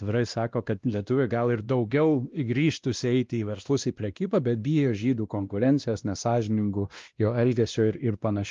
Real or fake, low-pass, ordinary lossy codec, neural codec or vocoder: fake; 7.2 kHz; Opus, 32 kbps; codec, 16 kHz, 1 kbps, X-Codec, WavLM features, trained on Multilingual LibriSpeech